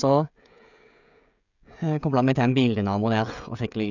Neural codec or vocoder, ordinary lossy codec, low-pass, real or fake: codec, 16 kHz, 16 kbps, FreqCodec, smaller model; none; 7.2 kHz; fake